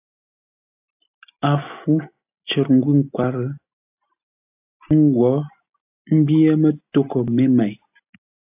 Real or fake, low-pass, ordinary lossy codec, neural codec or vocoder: real; 3.6 kHz; AAC, 32 kbps; none